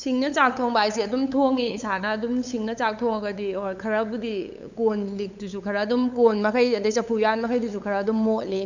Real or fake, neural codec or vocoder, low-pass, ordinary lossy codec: fake; codec, 16 kHz, 8 kbps, FunCodec, trained on LibriTTS, 25 frames a second; 7.2 kHz; none